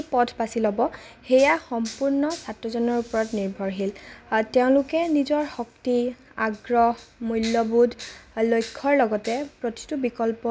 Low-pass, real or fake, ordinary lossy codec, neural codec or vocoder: none; real; none; none